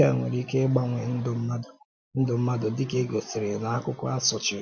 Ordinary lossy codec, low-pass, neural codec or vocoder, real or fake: none; none; none; real